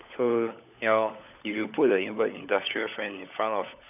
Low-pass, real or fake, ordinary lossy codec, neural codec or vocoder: 3.6 kHz; fake; none; codec, 16 kHz, 16 kbps, FunCodec, trained on LibriTTS, 50 frames a second